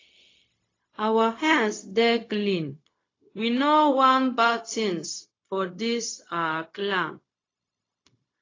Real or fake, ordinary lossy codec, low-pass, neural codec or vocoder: fake; AAC, 32 kbps; 7.2 kHz; codec, 16 kHz, 0.4 kbps, LongCat-Audio-Codec